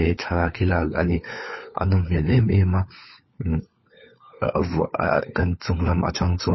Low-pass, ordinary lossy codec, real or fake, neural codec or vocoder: 7.2 kHz; MP3, 24 kbps; fake; codec, 16 kHz, 4 kbps, FunCodec, trained on LibriTTS, 50 frames a second